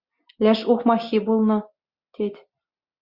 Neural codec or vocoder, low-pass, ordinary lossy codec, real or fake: none; 5.4 kHz; Opus, 64 kbps; real